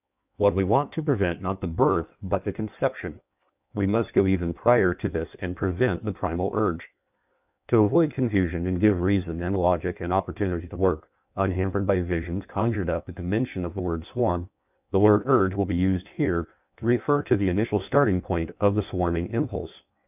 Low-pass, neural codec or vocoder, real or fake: 3.6 kHz; codec, 16 kHz in and 24 kHz out, 1.1 kbps, FireRedTTS-2 codec; fake